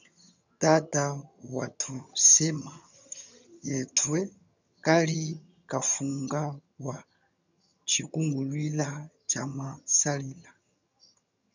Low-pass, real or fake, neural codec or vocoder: 7.2 kHz; fake; vocoder, 22.05 kHz, 80 mel bands, HiFi-GAN